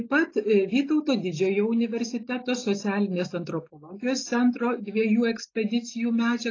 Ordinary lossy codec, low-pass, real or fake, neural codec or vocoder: AAC, 32 kbps; 7.2 kHz; real; none